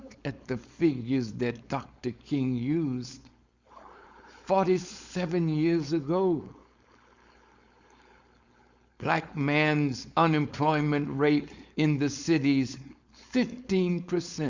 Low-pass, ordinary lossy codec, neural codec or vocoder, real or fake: 7.2 kHz; Opus, 64 kbps; codec, 16 kHz, 4.8 kbps, FACodec; fake